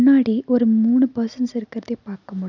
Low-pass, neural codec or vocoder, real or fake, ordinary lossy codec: 7.2 kHz; none; real; none